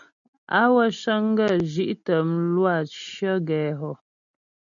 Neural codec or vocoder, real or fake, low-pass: none; real; 7.2 kHz